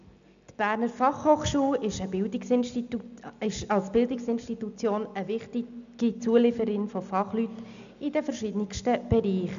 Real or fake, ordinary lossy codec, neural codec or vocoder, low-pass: real; none; none; 7.2 kHz